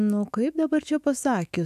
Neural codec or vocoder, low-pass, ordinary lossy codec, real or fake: autoencoder, 48 kHz, 128 numbers a frame, DAC-VAE, trained on Japanese speech; 14.4 kHz; AAC, 96 kbps; fake